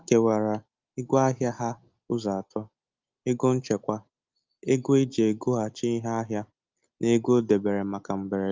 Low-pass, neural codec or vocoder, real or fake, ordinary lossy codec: 7.2 kHz; none; real; Opus, 32 kbps